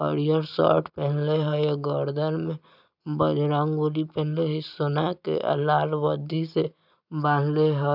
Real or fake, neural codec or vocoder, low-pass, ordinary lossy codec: real; none; 5.4 kHz; none